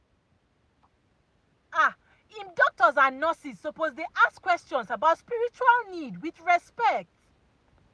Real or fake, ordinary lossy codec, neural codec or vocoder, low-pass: real; none; none; none